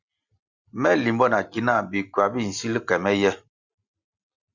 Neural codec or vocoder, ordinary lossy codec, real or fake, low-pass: none; Opus, 64 kbps; real; 7.2 kHz